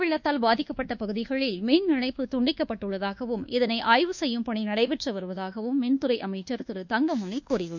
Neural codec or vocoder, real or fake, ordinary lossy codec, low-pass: codec, 24 kHz, 1.2 kbps, DualCodec; fake; none; 7.2 kHz